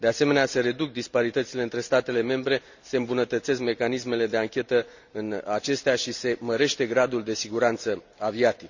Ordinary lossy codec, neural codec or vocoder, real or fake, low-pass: none; none; real; 7.2 kHz